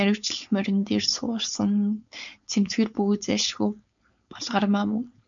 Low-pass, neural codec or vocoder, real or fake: 7.2 kHz; codec, 16 kHz, 4.8 kbps, FACodec; fake